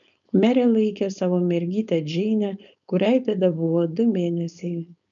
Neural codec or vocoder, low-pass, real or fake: codec, 16 kHz, 4.8 kbps, FACodec; 7.2 kHz; fake